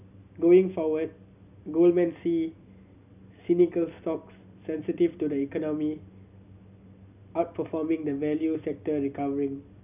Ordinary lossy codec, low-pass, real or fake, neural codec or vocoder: none; 3.6 kHz; real; none